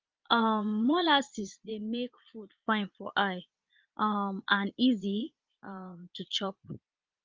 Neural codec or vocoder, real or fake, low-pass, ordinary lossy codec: vocoder, 22.05 kHz, 80 mel bands, Vocos; fake; 7.2 kHz; Opus, 32 kbps